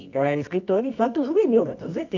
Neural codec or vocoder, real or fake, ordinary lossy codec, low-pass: codec, 24 kHz, 0.9 kbps, WavTokenizer, medium music audio release; fake; none; 7.2 kHz